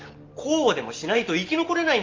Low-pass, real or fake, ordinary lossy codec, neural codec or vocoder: 7.2 kHz; real; Opus, 32 kbps; none